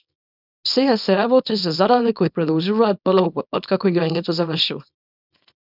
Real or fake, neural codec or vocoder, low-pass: fake; codec, 24 kHz, 0.9 kbps, WavTokenizer, small release; 5.4 kHz